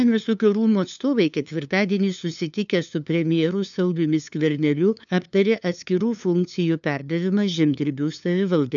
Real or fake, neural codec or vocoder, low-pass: fake; codec, 16 kHz, 2 kbps, FunCodec, trained on LibriTTS, 25 frames a second; 7.2 kHz